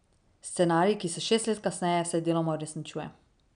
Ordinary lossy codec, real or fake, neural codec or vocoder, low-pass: none; real; none; 9.9 kHz